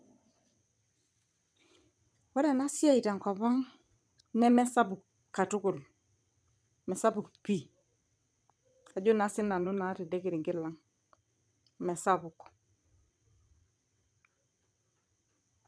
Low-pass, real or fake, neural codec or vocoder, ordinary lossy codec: none; fake; vocoder, 22.05 kHz, 80 mel bands, WaveNeXt; none